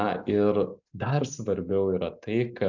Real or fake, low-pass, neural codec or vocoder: real; 7.2 kHz; none